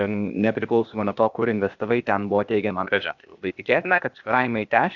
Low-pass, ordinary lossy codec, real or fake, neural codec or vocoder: 7.2 kHz; Opus, 64 kbps; fake; codec, 16 kHz, 0.8 kbps, ZipCodec